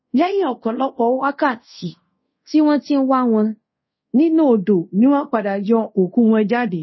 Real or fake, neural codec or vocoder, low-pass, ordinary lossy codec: fake; codec, 24 kHz, 0.5 kbps, DualCodec; 7.2 kHz; MP3, 24 kbps